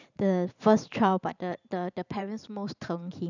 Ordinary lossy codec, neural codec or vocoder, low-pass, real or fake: none; vocoder, 44.1 kHz, 128 mel bands every 512 samples, BigVGAN v2; 7.2 kHz; fake